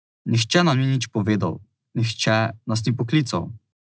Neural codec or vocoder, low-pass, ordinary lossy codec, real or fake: none; none; none; real